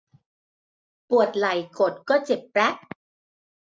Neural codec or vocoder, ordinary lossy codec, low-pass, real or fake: none; none; none; real